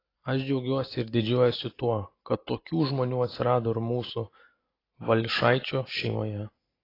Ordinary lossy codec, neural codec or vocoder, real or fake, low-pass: AAC, 24 kbps; none; real; 5.4 kHz